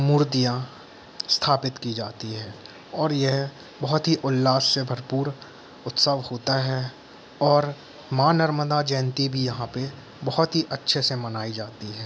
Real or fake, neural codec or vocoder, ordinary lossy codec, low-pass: real; none; none; none